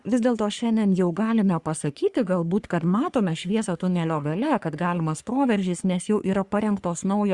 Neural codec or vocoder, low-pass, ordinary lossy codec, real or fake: codec, 44.1 kHz, 3.4 kbps, Pupu-Codec; 10.8 kHz; Opus, 64 kbps; fake